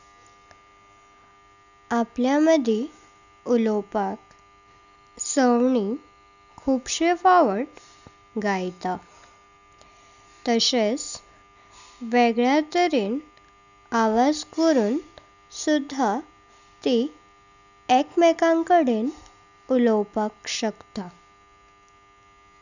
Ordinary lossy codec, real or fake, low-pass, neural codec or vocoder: none; real; 7.2 kHz; none